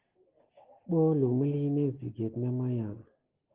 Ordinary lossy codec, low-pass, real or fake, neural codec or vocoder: Opus, 16 kbps; 3.6 kHz; real; none